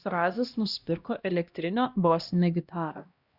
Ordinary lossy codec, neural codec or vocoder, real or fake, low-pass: Opus, 64 kbps; codec, 16 kHz, 1 kbps, X-Codec, HuBERT features, trained on LibriSpeech; fake; 5.4 kHz